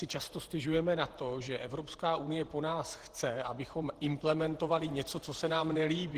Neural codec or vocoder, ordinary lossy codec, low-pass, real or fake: vocoder, 48 kHz, 128 mel bands, Vocos; Opus, 16 kbps; 14.4 kHz; fake